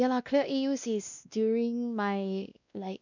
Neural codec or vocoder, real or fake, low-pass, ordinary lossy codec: codec, 16 kHz, 1 kbps, X-Codec, WavLM features, trained on Multilingual LibriSpeech; fake; 7.2 kHz; none